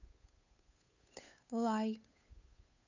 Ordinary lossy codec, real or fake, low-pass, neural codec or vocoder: none; fake; 7.2 kHz; codec, 16 kHz, 8 kbps, FunCodec, trained on Chinese and English, 25 frames a second